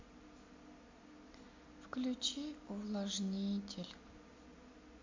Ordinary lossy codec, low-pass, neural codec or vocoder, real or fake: MP3, 48 kbps; 7.2 kHz; none; real